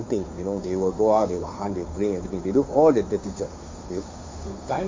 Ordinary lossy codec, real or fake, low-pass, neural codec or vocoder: MP3, 64 kbps; fake; 7.2 kHz; codec, 16 kHz, 2 kbps, FunCodec, trained on Chinese and English, 25 frames a second